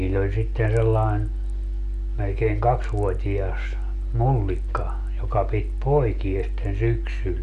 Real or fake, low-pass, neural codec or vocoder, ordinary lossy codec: real; 10.8 kHz; none; none